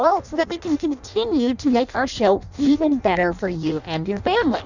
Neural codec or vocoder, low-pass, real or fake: codec, 16 kHz in and 24 kHz out, 0.6 kbps, FireRedTTS-2 codec; 7.2 kHz; fake